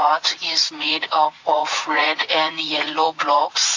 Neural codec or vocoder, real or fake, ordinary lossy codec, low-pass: vocoder, 44.1 kHz, 80 mel bands, Vocos; fake; AAC, 48 kbps; 7.2 kHz